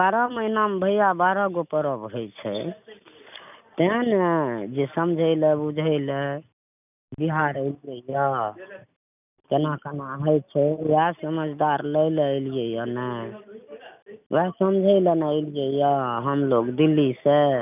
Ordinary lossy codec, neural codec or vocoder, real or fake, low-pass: none; none; real; 3.6 kHz